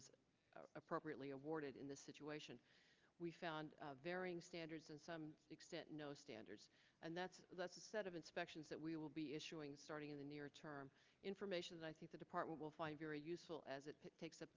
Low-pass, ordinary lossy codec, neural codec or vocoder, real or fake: 7.2 kHz; Opus, 24 kbps; none; real